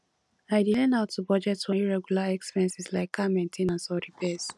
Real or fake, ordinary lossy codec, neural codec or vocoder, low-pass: real; none; none; none